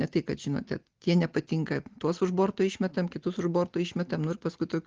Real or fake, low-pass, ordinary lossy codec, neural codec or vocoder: real; 7.2 kHz; Opus, 16 kbps; none